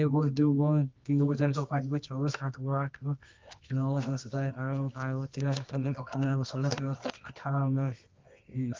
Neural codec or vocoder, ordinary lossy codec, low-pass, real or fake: codec, 24 kHz, 0.9 kbps, WavTokenizer, medium music audio release; Opus, 32 kbps; 7.2 kHz; fake